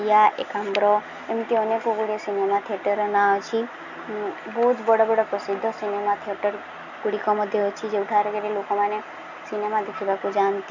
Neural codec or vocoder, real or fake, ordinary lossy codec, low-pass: none; real; none; 7.2 kHz